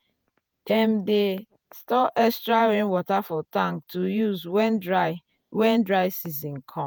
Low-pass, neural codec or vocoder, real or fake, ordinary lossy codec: none; vocoder, 48 kHz, 128 mel bands, Vocos; fake; none